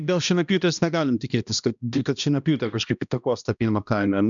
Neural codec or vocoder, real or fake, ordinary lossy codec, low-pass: codec, 16 kHz, 1 kbps, X-Codec, HuBERT features, trained on balanced general audio; fake; MP3, 96 kbps; 7.2 kHz